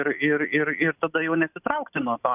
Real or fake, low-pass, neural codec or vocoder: real; 3.6 kHz; none